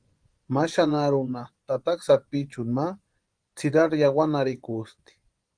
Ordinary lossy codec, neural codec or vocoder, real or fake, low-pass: Opus, 24 kbps; vocoder, 24 kHz, 100 mel bands, Vocos; fake; 9.9 kHz